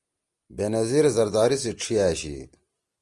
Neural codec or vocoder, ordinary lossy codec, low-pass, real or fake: none; Opus, 32 kbps; 10.8 kHz; real